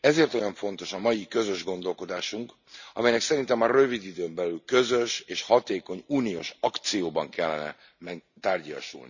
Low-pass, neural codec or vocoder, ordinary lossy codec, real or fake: 7.2 kHz; none; none; real